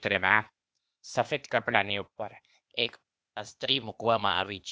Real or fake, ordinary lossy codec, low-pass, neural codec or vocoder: fake; none; none; codec, 16 kHz, 0.8 kbps, ZipCodec